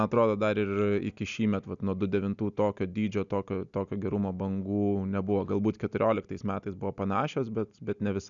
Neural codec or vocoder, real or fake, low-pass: none; real; 7.2 kHz